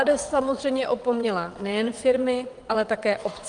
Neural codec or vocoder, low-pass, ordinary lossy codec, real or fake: vocoder, 22.05 kHz, 80 mel bands, WaveNeXt; 9.9 kHz; Opus, 32 kbps; fake